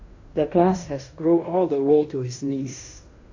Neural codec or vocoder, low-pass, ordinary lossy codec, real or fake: codec, 16 kHz in and 24 kHz out, 0.9 kbps, LongCat-Audio-Codec, fine tuned four codebook decoder; 7.2 kHz; AAC, 48 kbps; fake